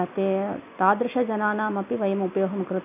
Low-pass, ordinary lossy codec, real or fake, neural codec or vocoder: 3.6 kHz; none; real; none